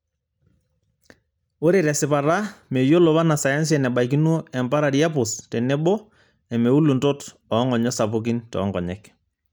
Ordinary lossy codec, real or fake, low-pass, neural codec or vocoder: none; real; none; none